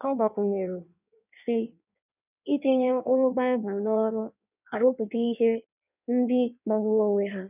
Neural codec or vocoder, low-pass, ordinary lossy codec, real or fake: codec, 16 kHz in and 24 kHz out, 1.1 kbps, FireRedTTS-2 codec; 3.6 kHz; none; fake